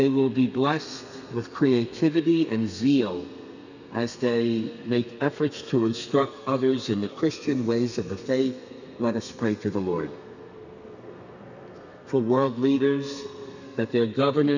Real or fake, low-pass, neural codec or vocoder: fake; 7.2 kHz; codec, 32 kHz, 1.9 kbps, SNAC